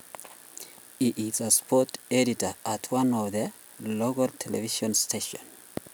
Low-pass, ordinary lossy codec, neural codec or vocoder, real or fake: none; none; none; real